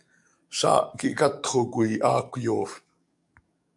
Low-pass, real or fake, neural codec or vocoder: 10.8 kHz; fake; codec, 44.1 kHz, 7.8 kbps, DAC